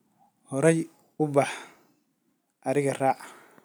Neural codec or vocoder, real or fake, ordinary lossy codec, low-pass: none; real; none; none